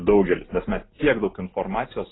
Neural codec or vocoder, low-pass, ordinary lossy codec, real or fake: none; 7.2 kHz; AAC, 16 kbps; real